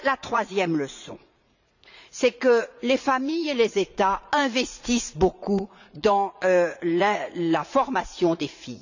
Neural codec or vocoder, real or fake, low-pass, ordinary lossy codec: vocoder, 44.1 kHz, 80 mel bands, Vocos; fake; 7.2 kHz; none